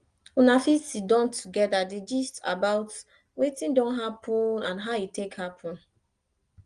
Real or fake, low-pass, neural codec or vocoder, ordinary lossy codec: real; 9.9 kHz; none; Opus, 24 kbps